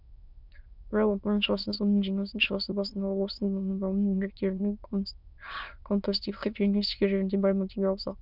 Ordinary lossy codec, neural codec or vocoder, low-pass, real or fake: none; autoencoder, 22.05 kHz, a latent of 192 numbers a frame, VITS, trained on many speakers; 5.4 kHz; fake